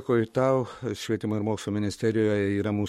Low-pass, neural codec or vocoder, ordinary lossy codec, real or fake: 19.8 kHz; codec, 44.1 kHz, 7.8 kbps, Pupu-Codec; MP3, 64 kbps; fake